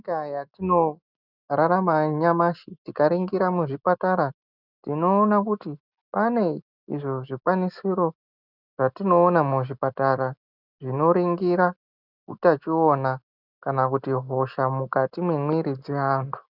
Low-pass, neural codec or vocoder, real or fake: 5.4 kHz; none; real